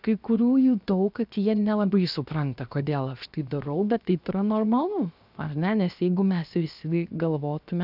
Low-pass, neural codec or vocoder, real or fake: 5.4 kHz; codec, 16 kHz, 0.7 kbps, FocalCodec; fake